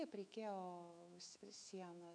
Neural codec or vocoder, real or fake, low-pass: autoencoder, 48 kHz, 128 numbers a frame, DAC-VAE, trained on Japanese speech; fake; 9.9 kHz